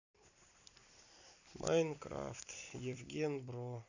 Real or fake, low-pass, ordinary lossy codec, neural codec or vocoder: fake; 7.2 kHz; none; vocoder, 44.1 kHz, 128 mel bands every 256 samples, BigVGAN v2